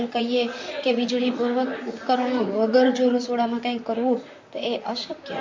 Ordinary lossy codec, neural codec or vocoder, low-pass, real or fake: MP3, 48 kbps; vocoder, 44.1 kHz, 128 mel bands, Pupu-Vocoder; 7.2 kHz; fake